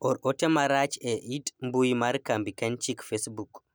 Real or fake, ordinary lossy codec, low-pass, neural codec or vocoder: real; none; none; none